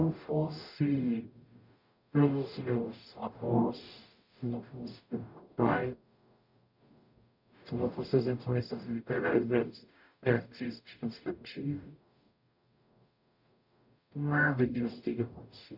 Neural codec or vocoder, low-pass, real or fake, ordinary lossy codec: codec, 44.1 kHz, 0.9 kbps, DAC; 5.4 kHz; fake; none